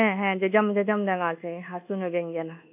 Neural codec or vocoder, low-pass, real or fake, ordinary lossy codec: codec, 24 kHz, 1.2 kbps, DualCodec; 3.6 kHz; fake; AAC, 32 kbps